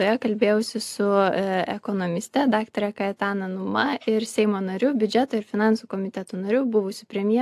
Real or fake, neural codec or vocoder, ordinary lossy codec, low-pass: real; none; AAC, 64 kbps; 14.4 kHz